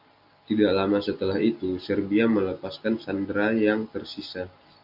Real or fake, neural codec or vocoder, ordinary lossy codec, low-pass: real; none; AAC, 48 kbps; 5.4 kHz